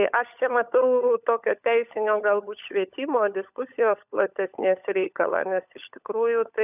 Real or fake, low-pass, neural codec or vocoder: fake; 3.6 kHz; codec, 16 kHz, 16 kbps, FunCodec, trained on LibriTTS, 50 frames a second